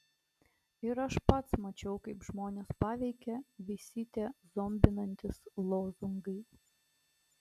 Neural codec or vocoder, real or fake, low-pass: none; real; 14.4 kHz